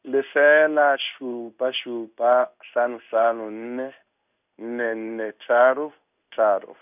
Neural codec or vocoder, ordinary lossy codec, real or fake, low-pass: codec, 16 kHz in and 24 kHz out, 1 kbps, XY-Tokenizer; none; fake; 3.6 kHz